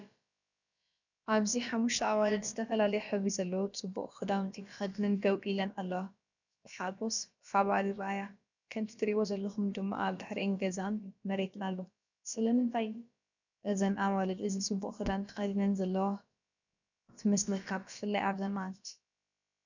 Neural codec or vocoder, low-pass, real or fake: codec, 16 kHz, about 1 kbps, DyCAST, with the encoder's durations; 7.2 kHz; fake